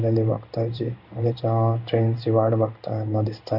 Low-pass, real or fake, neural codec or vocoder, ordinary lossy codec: 5.4 kHz; fake; codec, 16 kHz in and 24 kHz out, 1 kbps, XY-Tokenizer; none